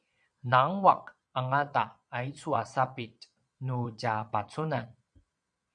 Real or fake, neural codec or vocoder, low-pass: fake; vocoder, 22.05 kHz, 80 mel bands, Vocos; 9.9 kHz